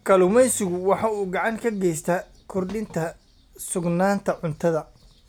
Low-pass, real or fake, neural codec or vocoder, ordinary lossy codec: none; real; none; none